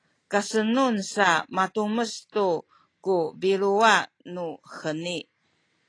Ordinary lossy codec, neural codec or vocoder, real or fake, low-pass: AAC, 32 kbps; none; real; 9.9 kHz